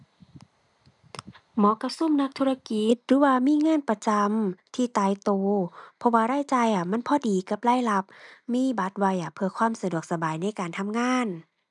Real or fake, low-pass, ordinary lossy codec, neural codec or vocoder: real; 10.8 kHz; none; none